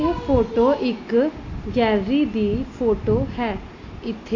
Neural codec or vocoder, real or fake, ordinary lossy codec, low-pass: none; real; AAC, 32 kbps; 7.2 kHz